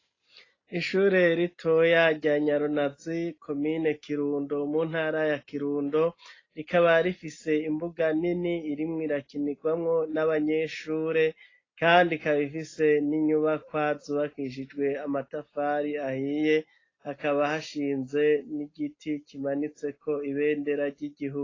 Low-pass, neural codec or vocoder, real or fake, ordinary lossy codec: 7.2 kHz; none; real; AAC, 32 kbps